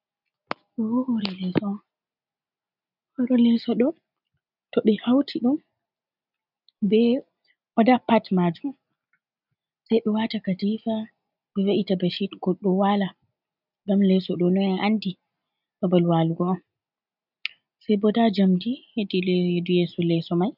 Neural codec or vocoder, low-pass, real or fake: none; 5.4 kHz; real